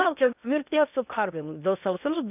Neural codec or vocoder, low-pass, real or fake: codec, 16 kHz in and 24 kHz out, 0.8 kbps, FocalCodec, streaming, 65536 codes; 3.6 kHz; fake